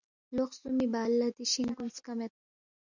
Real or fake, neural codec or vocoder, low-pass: real; none; 7.2 kHz